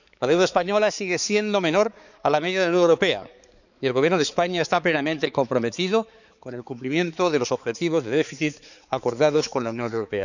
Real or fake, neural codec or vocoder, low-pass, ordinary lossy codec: fake; codec, 16 kHz, 4 kbps, X-Codec, HuBERT features, trained on balanced general audio; 7.2 kHz; none